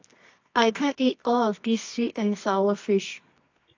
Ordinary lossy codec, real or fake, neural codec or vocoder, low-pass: AAC, 48 kbps; fake; codec, 24 kHz, 0.9 kbps, WavTokenizer, medium music audio release; 7.2 kHz